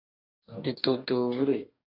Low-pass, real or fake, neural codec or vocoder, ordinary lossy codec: 5.4 kHz; fake; codec, 44.1 kHz, 2.6 kbps, DAC; AAC, 48 kbps